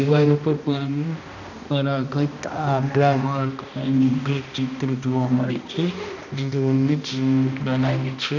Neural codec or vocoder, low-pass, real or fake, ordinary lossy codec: codec, 16 kHz, 1 kbps, X-Codec, HuBERT features, trained on balanced general audio; 7.2 kHz; fake; none